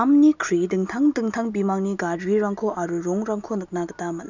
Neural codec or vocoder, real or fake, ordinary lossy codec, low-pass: none; real; none; 7.2 kHz